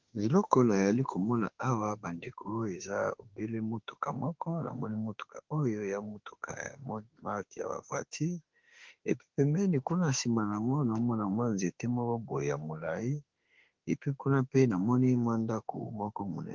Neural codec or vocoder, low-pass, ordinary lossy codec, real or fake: autoencoder, 48 kHz, 32 numbers a frame, DAC-VAE, trained on Japanese speech; 7.2 kHz; Opus, 16 kbps; fake